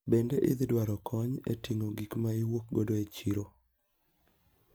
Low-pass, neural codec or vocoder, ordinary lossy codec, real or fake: none; none; none; real